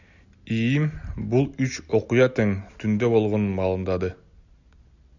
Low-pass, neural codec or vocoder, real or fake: 7.2 kHz; none; real